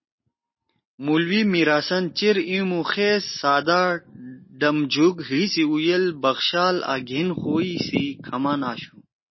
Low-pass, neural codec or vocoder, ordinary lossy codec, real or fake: 7.2 kHz; none; MP3, 24 kbps; real